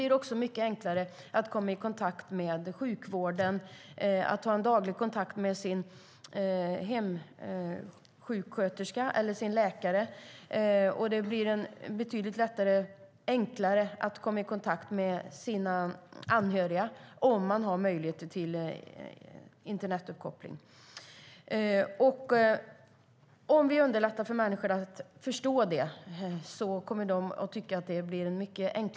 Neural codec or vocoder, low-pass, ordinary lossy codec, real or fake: none; none; none; real